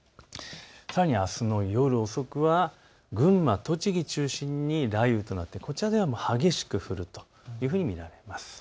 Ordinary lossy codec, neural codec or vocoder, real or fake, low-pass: none; none; real; none